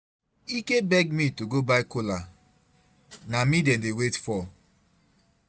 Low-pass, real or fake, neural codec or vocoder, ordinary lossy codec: none; real; none; none